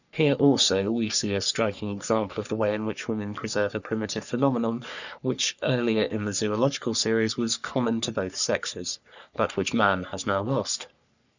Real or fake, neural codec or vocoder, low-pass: fake; codec, 44.1 kHz, 3.4 kbps, Pupu-Codec; 7.2 kHz